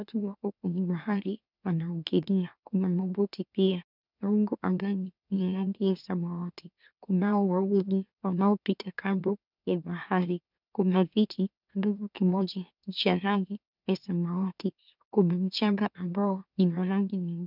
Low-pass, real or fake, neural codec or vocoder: 5.4 kHz; fake; autoencoder, 44.1 kHz, a latent of 192 numbers a frame, MeloTTS